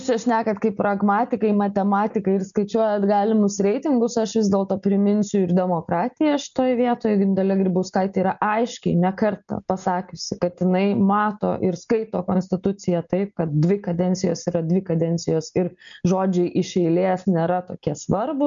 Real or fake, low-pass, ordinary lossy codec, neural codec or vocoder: real; 7.2 kHz; MP3, 96 kbps; none